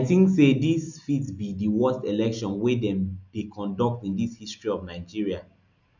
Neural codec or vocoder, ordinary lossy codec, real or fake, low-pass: none; none; real; 7.2 kHz